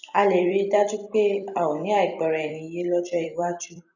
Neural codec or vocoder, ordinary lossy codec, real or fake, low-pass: vocoder, 44.1 kHz, 128 mel bands every 256 samples, BigVGAN v2; none; fake; 7.2 kHz